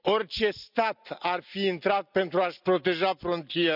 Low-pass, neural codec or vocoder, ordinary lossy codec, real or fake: 5.4 kHz; none; none; real